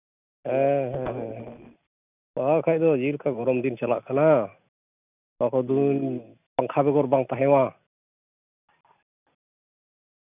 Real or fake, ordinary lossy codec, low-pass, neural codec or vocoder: real; none; 3.6 kHz; none